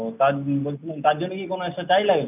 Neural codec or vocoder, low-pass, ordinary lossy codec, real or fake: none; 3.6 kHz; none; real